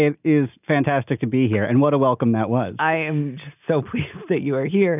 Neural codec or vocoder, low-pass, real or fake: none; 3.6 kHz; real